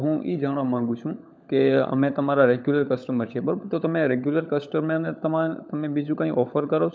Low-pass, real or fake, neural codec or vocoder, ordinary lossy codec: none; fake; codec, 16 kHz, 16 kbps, FunCodec, trained on LibriTTS, 50 frames a second; none